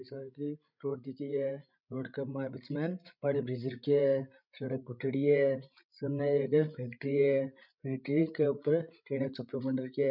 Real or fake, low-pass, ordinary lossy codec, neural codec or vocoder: fake; 5.4 kHz; none; codec, 16 kHz, 16 kbps, FreqCodec, larger model